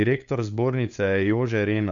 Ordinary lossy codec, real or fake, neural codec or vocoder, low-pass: AAC, 64 kbps; fake; codec, 16 kHz, 8 kbps, FunCodec, trained on LibriTTS, 25 frames a second; 7.2 kHz